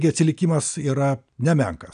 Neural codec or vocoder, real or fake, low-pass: none; real; 9.9 kHz